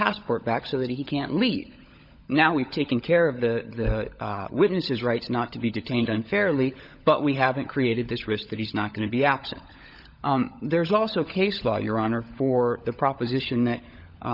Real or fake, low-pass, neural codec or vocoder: fake; 5.4 kHz; codec, 16 kHz, 16 kbps, FunCodec, trained on LibriTTS, 50 frames a second